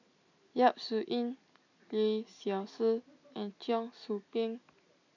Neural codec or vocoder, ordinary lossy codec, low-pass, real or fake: none; none; 7.2 kHz; real